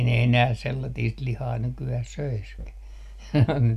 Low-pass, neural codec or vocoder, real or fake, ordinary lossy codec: 14.4 kHz; none; real; none